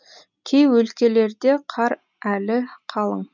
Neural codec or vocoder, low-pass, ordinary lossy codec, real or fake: none; 7.2 kHz; none; real